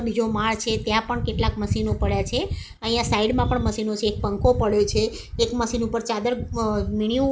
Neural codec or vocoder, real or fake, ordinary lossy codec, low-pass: none; real; none; none